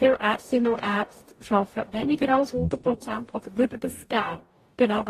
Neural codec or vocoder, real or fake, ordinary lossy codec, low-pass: codec, 44.1 kHz, 0.9 kbps, DAC; fake; AAC, 48 kbps; 14.4 kHz